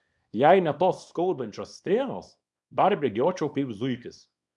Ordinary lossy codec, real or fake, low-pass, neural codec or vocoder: MP3, 96 kbps; fake; 10.8 kHz; codec, 24 kHz, 0.9 kbps, WavTokenizer, small release